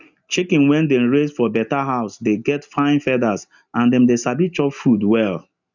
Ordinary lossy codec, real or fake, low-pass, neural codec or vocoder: none; real; 7.2 kHz; none